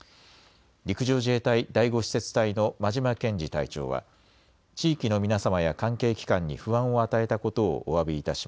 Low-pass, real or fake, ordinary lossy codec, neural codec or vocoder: none; real; none; none